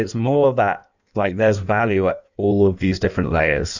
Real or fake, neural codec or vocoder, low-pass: fake; codec, 16 kHz in and 24 kHz out, 1.1 kbps, FireRedTTS-2 codec; 7.2 kHz